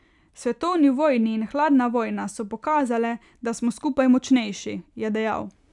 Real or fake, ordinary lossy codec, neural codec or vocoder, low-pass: real; none; none; 10.8 kHz